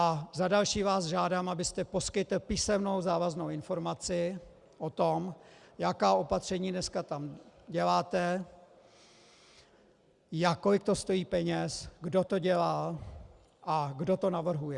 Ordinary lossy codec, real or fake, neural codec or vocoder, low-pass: Opus, 64 kbps; real; none; 10.8 kHz